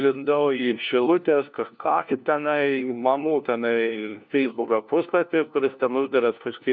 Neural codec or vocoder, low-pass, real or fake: codec, 16 kHz, 1 kbps, FunCodec, trained on LibriTTS, 50 frames a second; 7.2 kHz; fake